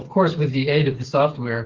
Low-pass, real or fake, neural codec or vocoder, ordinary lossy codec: 7.2 kHz; fake; codec, 16 kHz, 4 kbps, FreqCodec, smaller model; Opus, 16 kbps